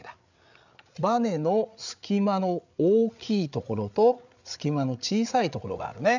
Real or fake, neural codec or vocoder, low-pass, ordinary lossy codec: fake; codec, 16 kHz, 8 kbps, FreqCodec, larger model; 7.2 kHz; none